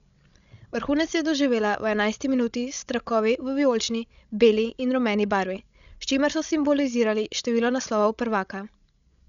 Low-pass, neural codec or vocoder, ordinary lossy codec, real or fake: 7.2 kHz; codec, 16 kHz, 16 kbps, FreqCodec, larger model; none; fake